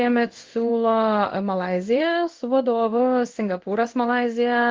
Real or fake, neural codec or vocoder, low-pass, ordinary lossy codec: fake; codec, 16 kHz in and 24 kHz out, 1 kbps, XY-Tokenizer; 7.2 kHz; Opus, 16 kbps